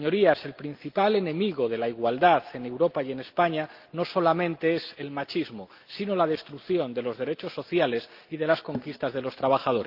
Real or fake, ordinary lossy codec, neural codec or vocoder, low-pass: real; Opus, 32 kbps; none; 5.4 kHz